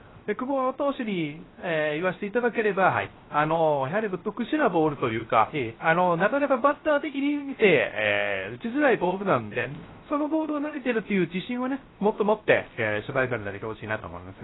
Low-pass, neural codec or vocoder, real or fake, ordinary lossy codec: 7.2 kHz; codec, 16 kHz, 0.3 kbps, FocalCodec; fake; AAC, 16 kbps